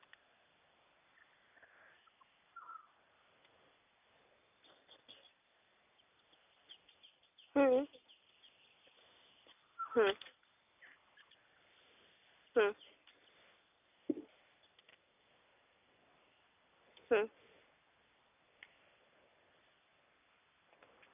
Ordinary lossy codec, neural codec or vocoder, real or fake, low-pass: none; none; real; 3.6 kHz